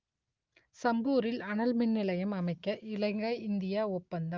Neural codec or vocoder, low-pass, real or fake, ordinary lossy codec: none; 7.2 kHz; real; Opus, 24 kbps